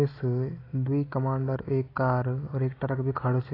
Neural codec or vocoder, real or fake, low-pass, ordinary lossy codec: none; real; 5.4 kHz; AAC, 24 kbps